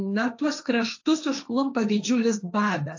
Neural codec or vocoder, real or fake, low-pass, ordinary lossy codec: codec, 16 kHz, 1.1 kbps, Voila-Tokenizer; fake; 7.2 kHz; AAC, 48 kbps